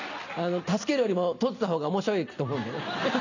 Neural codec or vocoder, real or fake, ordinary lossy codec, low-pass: none; real; none; 7.2 kHz